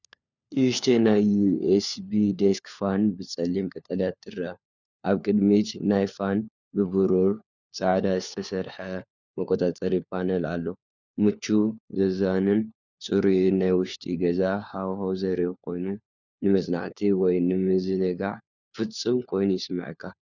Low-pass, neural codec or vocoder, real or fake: 7.2 kHz; codec, 16 kHz, 4 kbps, FunCodec, trained on LibriTTS, 50 frames a second; fake